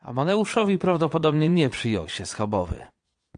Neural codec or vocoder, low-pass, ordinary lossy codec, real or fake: vocoder, 22.05 kHz, 80 mel bands, Vocos; 9.9 kHz; AAC, 64 kbps; fake